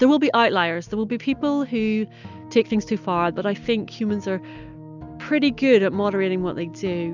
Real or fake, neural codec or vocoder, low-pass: real; none; 7.2 kHz